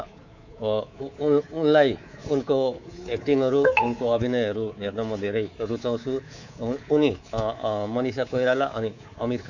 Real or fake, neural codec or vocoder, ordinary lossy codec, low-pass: fake; codec, 24 kHz, 3.1 kbps, DualCodec; none; 7.2 kHz